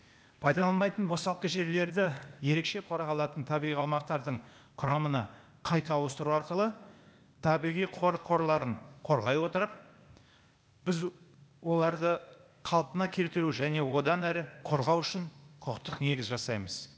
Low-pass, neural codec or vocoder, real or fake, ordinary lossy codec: none; codec, 16 kHz, 0.8 kbps, ZipCodec; fake; none